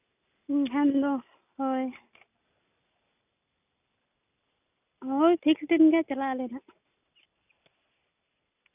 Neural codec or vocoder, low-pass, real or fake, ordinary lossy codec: none; 3.6 kHz; real; none